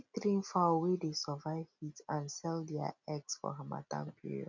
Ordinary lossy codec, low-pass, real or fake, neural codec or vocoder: none; 7.2 kHz; real; none